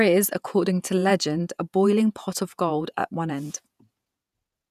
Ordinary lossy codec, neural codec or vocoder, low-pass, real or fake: none; vocoder, 48 kHz, 128 mel bands, Vocos; 14.4 kHz; fake